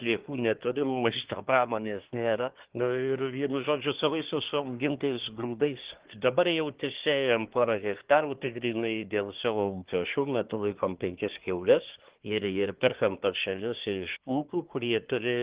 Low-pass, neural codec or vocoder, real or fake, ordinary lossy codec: 3.6 kHz; codec, 24 kHz, 1 kbps, SNAC; fake; Opus, 16 kbps